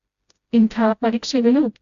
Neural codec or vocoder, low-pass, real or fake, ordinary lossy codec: codec, 16 kHz, 0.5 kbps, FreqCodec, smaller model; 7.2 kHz; fake; Opus, 64 kbps